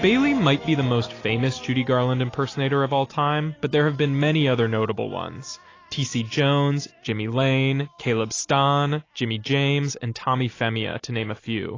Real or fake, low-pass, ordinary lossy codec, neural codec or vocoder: real; 7.2 kHz; AAC, 32 kbps; none